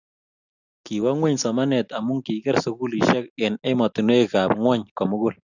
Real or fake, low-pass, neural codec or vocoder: real; 7.2 kHz; none